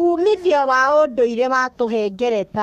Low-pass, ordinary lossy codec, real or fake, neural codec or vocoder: 14.4 kHz; none; fake; codec, 32 kHz, 1.9 kbps, SNAC